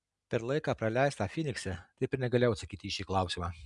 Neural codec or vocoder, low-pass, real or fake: none; 10.8 kHz; real